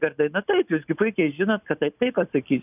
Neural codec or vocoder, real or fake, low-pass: none; real; 3.6 kHz